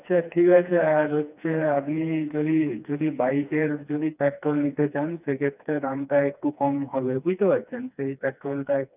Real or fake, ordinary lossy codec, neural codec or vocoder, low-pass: fake; none; codec, 16 kHz, 2 kbps, FreqCodec, smaller model; 3.6 kHz